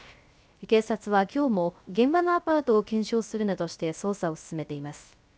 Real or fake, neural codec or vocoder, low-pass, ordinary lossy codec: fake; codec, 16 kHz, 0.3 kbps, FocalCodec; none; none